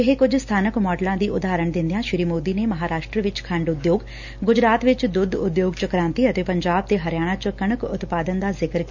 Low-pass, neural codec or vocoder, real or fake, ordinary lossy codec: 7.2 kHz; none; real; none